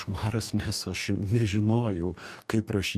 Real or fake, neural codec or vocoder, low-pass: fake; codec, 44.1 kHz, 2.6 kbps, DAC; 14.4 kHz